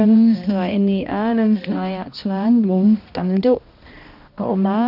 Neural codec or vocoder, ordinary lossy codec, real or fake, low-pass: codec, 16 kHz, 1 kbps, X-Codec, HuBERT features, trained on balanced general audio; none; fake; 5.4 kHz